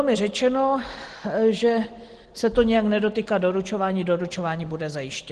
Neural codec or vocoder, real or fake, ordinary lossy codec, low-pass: none; real; Opus, 16 kbps; 9.9 kHz